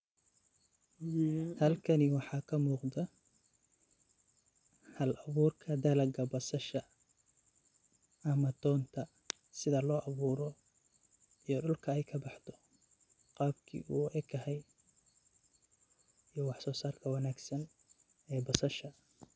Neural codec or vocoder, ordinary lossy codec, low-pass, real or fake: none; none; none; real